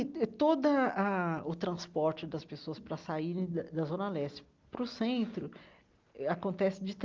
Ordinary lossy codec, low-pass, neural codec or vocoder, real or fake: Opus, 32 kbps; 7.2 kHz; none; real